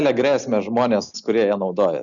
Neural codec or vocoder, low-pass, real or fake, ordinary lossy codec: none; 7.2 kHz; real; MP3, 96 kbps